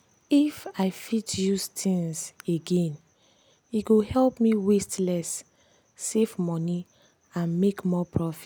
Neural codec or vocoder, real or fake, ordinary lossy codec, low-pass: none; real; none; none